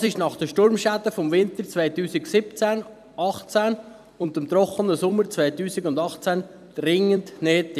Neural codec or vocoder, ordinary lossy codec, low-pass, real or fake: vocoder, 44.1 kHz, 128 mel bands every 256 samples, BigVGAN v2; none; 14.4 kHz; fake